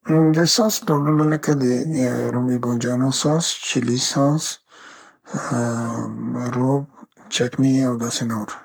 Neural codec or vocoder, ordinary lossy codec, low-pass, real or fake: codec, 44.1 kHz, 3.4 kbps, Pupu-Codec; none; none; fake